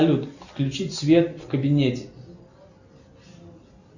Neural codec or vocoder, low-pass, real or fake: none; 7.2 kHz; real